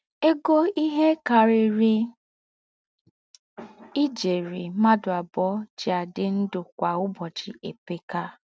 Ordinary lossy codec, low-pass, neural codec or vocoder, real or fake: none; none; none; real